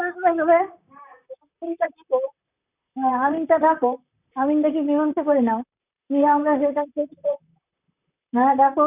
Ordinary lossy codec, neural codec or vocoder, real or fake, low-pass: none; vocoder, 44.1 kHz, 128 mel bands, Pupu-Vocoder; fake; 3.6 kHz